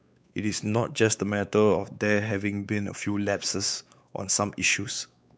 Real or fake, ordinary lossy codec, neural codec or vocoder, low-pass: fake; none; codec, 16 kHz, 4 kbps, X-Codec, WavLM features, trained on Multilingual LibriSpeech; none